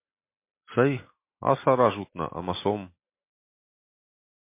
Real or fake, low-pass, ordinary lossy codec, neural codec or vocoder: real; 3.6 kHz; MP3, 24 kbps; none